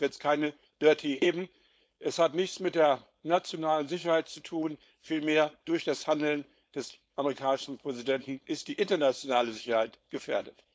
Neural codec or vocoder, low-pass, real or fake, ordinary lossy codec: codec, 16 kHz, 4.8 kbps, FACodec; none; fake; none